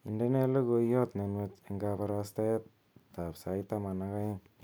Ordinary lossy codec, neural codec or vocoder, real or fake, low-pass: none; none; real; none